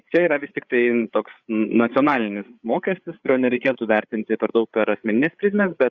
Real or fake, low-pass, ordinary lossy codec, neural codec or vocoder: fake; 7.2 kHz; Opus, 64 kbps; codec, 16 kHz, 8 kbps, FreqCodec, larger model